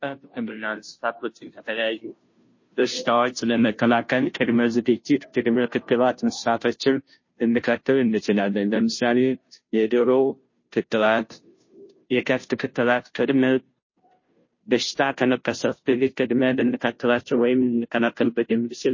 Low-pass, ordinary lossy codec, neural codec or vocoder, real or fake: 7.2 kHz; MP3, 32 kbps; codec, 16 kHz, 0.5 kbps, FunCodec, trained on Chinese and English, 25 frames a second; fake